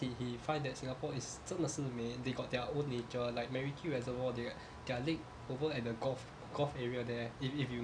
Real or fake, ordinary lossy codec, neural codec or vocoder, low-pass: real; none; none; 9.9 kHz